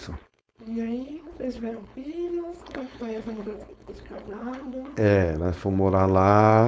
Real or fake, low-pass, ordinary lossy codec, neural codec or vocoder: fake; none; none; codec, 16 kHz, 4.8 kbps, FACodec